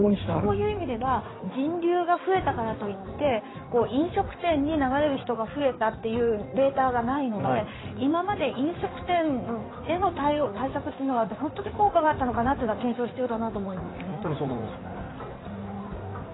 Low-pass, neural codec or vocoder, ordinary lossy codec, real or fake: 7.2 kHz; codec, 16 kHz in and 24 kHz out, 2.2 kbps, FireRedTTS-2 codec; AAC, 16 kbps; fake